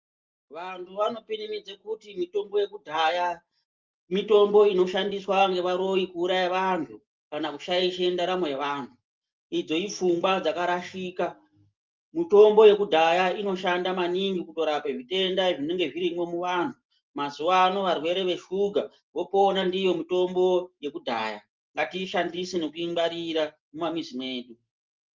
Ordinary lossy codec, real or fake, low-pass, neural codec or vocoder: Opus, 32 kbps; real; 7.2 kHz; none